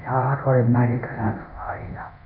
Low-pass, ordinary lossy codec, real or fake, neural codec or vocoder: 5.4 kHz; none; fake; codec, 24 kHz, 0.9 kbps, DualCodec